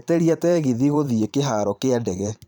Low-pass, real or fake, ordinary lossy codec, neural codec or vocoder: 19.8 kHz; fake; none; vocoder, 44.1 kHz, 128 mel bands every 512 samples, BigVGAN v2